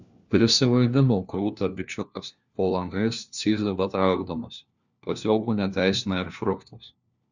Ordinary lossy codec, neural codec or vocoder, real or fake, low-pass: Opus, 64 kbps; codec, 16 kHz, 1 kbps, FunCodec, trained on LibriTTS, 50 frames a second; fake; 7.2 kHz